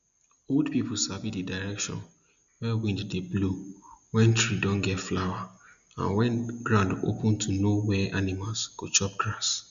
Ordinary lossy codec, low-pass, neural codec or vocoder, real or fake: AAC, 96 kbps; 7.2 kHz; none; real